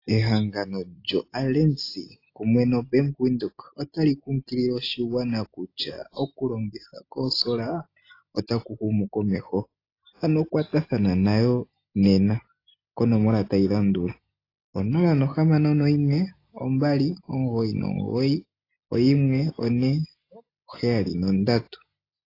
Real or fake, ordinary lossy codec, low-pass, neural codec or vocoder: real; AAC, 32 kbps; 5.4 kHz; none